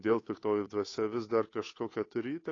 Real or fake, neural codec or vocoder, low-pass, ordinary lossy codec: fake; codec, 16 kHz, 8 kbps, FunCodec, trained on Chinese and English, 25 frames a second; 7.2 kHz; AAC, 32 kbps